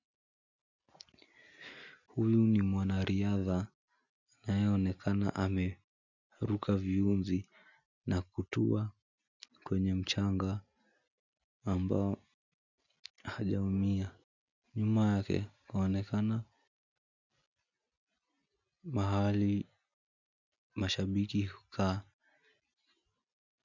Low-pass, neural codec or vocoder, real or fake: 7.2 kHz; none; real